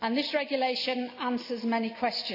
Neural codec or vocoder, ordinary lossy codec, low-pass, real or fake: none; none; 5.4 kHz; real